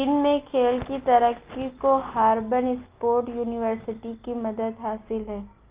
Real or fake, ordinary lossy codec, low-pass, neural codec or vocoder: real; Opus, 32 kbps; 3.6 kHz; none